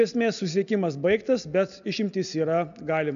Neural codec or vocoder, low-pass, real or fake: none; 7.2 kHz; real